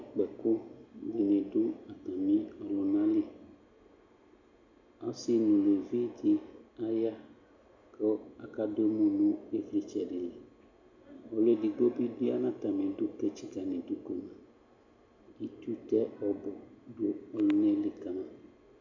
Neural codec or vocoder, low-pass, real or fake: none; 7.2 kHz; real